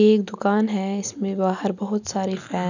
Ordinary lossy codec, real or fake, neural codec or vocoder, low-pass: none; real; none; 7.2 kHz